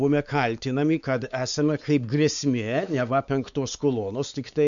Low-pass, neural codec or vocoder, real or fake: 7.2 kHz; codec, 16 kHz, 4 kbps, X-Codec, WavLM features, trained on Multilingual LibriSpeech; fake